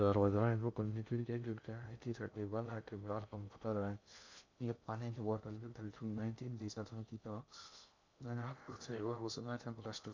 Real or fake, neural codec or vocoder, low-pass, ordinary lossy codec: fake; codec, 16 kHz in and 24 kHz out, 0.6 kbps, FocalCodec, streaming, 2048 codes; 7.2 kHz; MP3, 64 kbps